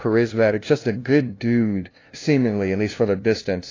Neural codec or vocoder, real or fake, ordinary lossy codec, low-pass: codec, 16 kHz, 0.5 kbps, FunCodec, trained on LibriTTS, 25 frames a second; fake; AAC, 32 kbps; 7.2 kHz